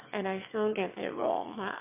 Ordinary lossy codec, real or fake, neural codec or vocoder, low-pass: MP3, 32 kbps; fake; autoencoder, 22.05 kHz, a latent of 192 numbers a frame, VITS, trained on one speaker; 3.6 kHz